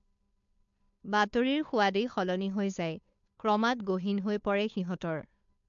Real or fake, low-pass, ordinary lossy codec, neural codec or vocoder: fake; 7.2 kHz; MP3, 64 kbps; codec, 16 kHz, 2 kbps, FunCodec, trained on Chinese and English, 25 frames a second